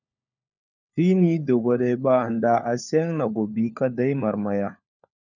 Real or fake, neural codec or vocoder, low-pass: fake; codec, 16 kHz, 4 kbps, FunCodec, trained on LibriTTS, 50 frames a second; 7.2 kHz